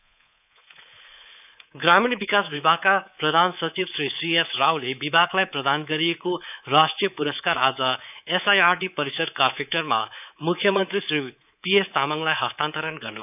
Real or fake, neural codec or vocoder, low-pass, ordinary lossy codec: fake; codec, 24 kHz, 3.1 kbps, DualCodec; 3.6 kHz; none